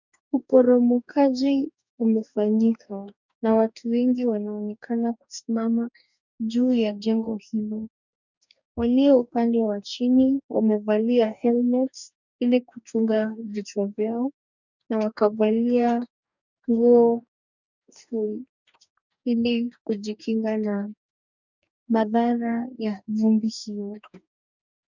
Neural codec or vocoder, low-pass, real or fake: codec, 44.1 kHz, 2.6 kbps, DAC; 7.2 kHz; fake